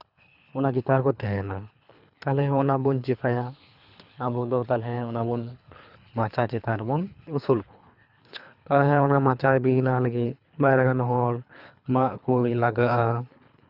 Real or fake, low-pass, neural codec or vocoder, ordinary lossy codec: fake; 5.4 kHz; codec, 24 kHz, 3 kbps, HILCodec; none